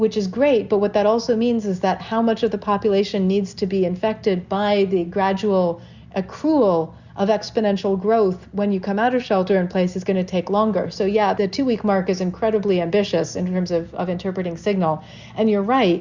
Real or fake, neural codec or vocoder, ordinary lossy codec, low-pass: real; none; Opus, 64 kbps; 7.2 kHz